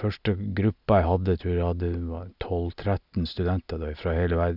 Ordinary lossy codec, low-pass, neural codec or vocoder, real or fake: none; 5.4 kHz; none; real